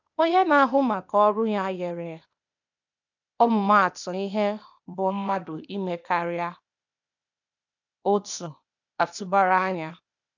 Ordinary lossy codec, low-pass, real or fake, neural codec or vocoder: none; 7.2 kHz; fake; codec, 16 kHz, 0.8 kbps, ZipCodec